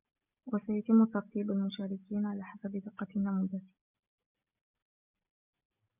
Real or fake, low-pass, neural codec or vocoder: real; 3.6 kHz; none